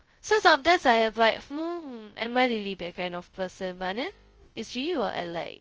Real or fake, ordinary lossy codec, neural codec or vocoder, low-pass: fake; Opus, 24 kbps; codec, 16 kHz, 0.2 kbps, FocalCodec; 7.2 kHz